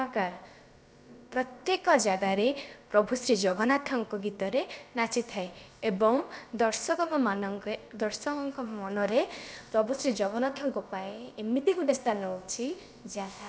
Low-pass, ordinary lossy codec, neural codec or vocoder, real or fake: none; none; codec, 16 kHz, about 1 kbps, DyCAST, with the encoder's durations; fake